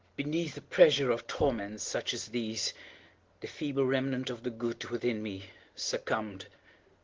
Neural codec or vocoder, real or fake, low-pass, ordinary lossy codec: none; real; 7.2 kHz; Opus, 16 kbps